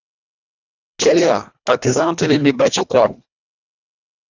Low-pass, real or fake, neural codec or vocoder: 7.2 kHz; fake; codec, 24 kHz, 1.5 kbps, HILCodec